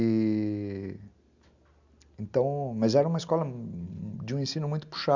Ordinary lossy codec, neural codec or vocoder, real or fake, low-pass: Opus, 64 kbps; none; real; 7.2 kHz